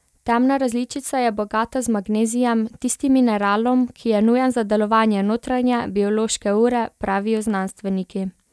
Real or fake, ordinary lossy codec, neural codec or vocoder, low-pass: real; none; none; none